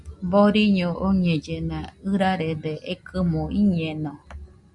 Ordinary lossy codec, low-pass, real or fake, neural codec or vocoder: Opus, 64 kbps; 10.8 kHz; real; none